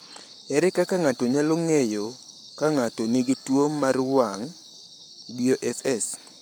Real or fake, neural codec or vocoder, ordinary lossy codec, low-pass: fake; codec, 44.1 kHz, 7.8 kbps, Pupu-Codec; none; none